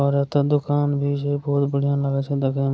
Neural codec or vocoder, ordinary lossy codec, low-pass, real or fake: none; none; none; real